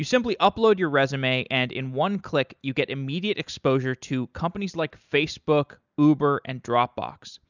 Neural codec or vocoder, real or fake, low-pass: none; real; 7.2 kHz